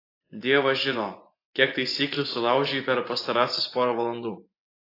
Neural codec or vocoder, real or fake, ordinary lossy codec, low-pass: vocoder, 24 kHz, 100 mel bands, Vocos; fake; AAC, 32 kbps; 5.4 kHz